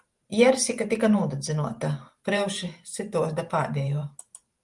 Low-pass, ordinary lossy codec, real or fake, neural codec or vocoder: 10.8 kHz; Opus, 32 kbps; fake; vocoder, 44.1 kHz, 128 mel bands every 512 samples, BigVGAN v2